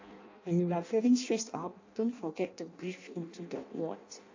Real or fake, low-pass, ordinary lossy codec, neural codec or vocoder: fake; 7.2 kHz; AAC, 48 kbps; codec, 16 kHz in and 24 kHz out, 0.6 kbps, FireRedTTS-2 codec